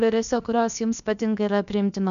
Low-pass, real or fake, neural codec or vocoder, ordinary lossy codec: 7.2 kHz; fake; codec, 16 kHz, about 1 kbps, DyCAST, with the encoder's durations; MP3, 96 kbps